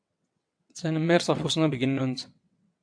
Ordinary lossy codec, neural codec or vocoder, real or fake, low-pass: AAC, 64 kbps; vocoder, 22.05 kHz, 80 mel bands, WaveNeXt; fake; 9.9 kHz